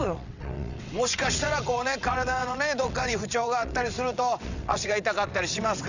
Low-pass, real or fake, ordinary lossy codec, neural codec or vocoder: 7.2 kHz; fake; none; vocoder, 22.05 kHz, 80 mel bands, WaveNeXt